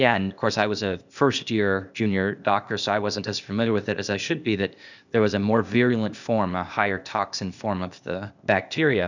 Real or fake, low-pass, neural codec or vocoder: fake; 7.2 kHz; codec, 16 kHz, 0.8 kbps, ZipCodec